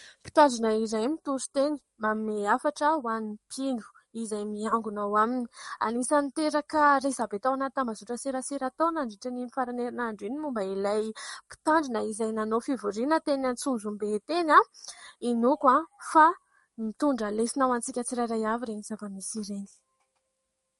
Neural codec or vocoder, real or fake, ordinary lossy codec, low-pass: codec, 44.1 kHz, 7.8 kbps, DAC; fake; MP3, 48 kbps; 19.8 kHz